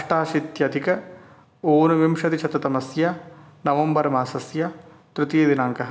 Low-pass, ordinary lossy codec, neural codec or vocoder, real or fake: none; none; none; real